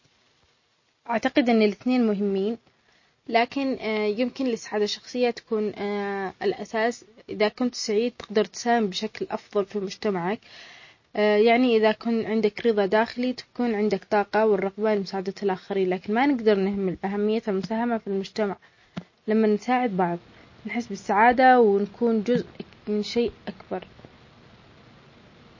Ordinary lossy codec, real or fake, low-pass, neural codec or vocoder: MP3, 32 kbps; real; 7.2 kHz; none